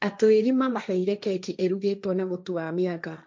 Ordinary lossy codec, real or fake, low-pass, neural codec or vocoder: none; fake; none; codec, 16 kHz, 1.1 kbps, Voila-Tokenizer